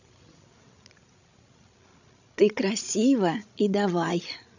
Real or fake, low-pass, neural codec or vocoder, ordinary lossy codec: fake; 7.2 kHz; codec, 16 kHz, 16 kbps, FreqCodec, larger model; none